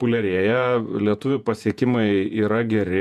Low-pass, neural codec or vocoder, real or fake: 14.4 kHz; vocoder, 48 kHz, 128 mel bands, Vocos; fake